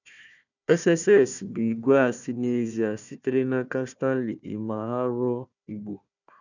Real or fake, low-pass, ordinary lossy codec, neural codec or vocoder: fake; 7.2 kHz; none; codec, 16 kHz, 1 kbps, FunCodec, trained on Chinese and English, 50 frames a second